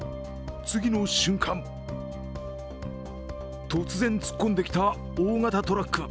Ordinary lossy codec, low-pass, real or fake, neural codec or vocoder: none; none; real; none